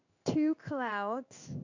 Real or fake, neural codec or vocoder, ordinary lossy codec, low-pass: fake; codec, 16 kHz in and 24 kHz out, 1 kbps, XY-Tokenizer; none; 7.2 kHz